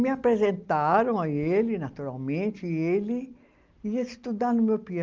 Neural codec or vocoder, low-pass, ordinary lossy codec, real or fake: none; 7.2 kHz; Opus, 24 kbps; real